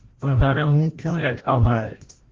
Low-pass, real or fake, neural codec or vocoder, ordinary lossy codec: 7.2 kHz; fake; codec, 16 kHz, 0.5 kbps, FreqCodec, larger model; Opus, 16 kbps